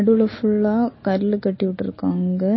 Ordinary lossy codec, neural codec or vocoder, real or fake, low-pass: MP3, 24 kbps; none; real; 7.2 kHz